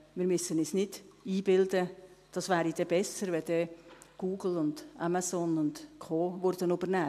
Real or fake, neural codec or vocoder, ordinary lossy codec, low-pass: real; none; AAC, 96 kbps; 14.4 kHz